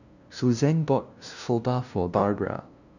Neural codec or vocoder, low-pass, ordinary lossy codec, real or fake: codec, 16 kHz, 0.5 kbps, FunCodec, trained on LibriTTS, 25 frames a second; 7.2 kHz; none; fake